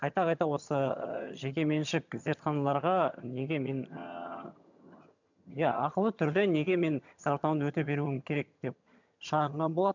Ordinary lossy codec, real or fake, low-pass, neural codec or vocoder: none; fake; 7.2 kHz; vocoder, 22.05 kHz, 80 mel bands, HiFi-GAN